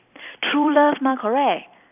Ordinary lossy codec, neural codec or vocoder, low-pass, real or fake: none; vocoder, 44.1 kHz, 128 mel bands every 256 samples, BigVGAN v2; 3.6 kHz; fake